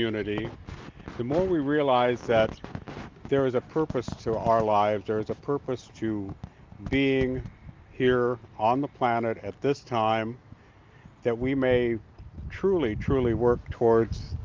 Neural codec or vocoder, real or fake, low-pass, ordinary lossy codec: none; real; 7.2 kHz; Opus, 24 kbps